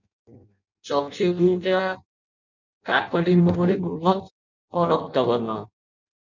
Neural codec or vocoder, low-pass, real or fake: codec, 16 kHz in and 24 kHz out, 0.6 kbps, FireRedTTS-2 codec; 7.2 kHz; fake